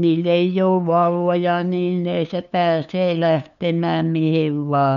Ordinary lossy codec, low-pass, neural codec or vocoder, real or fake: none; 7.2 kHz; codec, 16 kHz, 2 kbps, FunCodec, trained on LibriTTS, 25 frames a second; fake